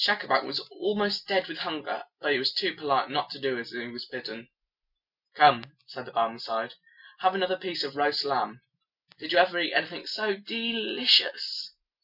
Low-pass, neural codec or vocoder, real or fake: 5.4 kHz; none; real